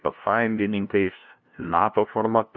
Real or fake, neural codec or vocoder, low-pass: fake; codec, 16 kHz, 0.5 kbps, FunCodec, trained on LibriTTS, 25 frames a second; 7.2 kHz